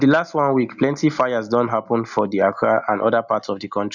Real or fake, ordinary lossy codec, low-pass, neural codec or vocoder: real; none; 7.2 kHz; none